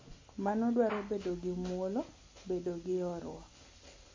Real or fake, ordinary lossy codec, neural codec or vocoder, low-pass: real; MP3, 32 kbps; none; 7.2 kHz